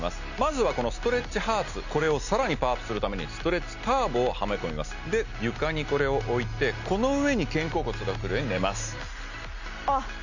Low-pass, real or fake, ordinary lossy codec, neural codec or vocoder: 7.2 kHz; real; none; none